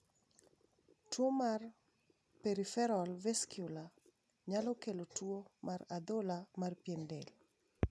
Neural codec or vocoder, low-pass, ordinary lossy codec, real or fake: none; none; none; real